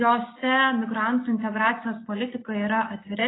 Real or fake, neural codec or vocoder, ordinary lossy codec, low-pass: real; none; AAC, 16 kbps; 7.2 kHz